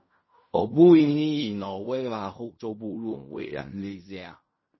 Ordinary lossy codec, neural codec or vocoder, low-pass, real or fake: MP3, 24 kbps; codec, 16 kHz in and 24 kHz out, 0.4 kbps, LongCat-Audio-Codec, fine tuned four codebook decoder; 7.2 kHz; fake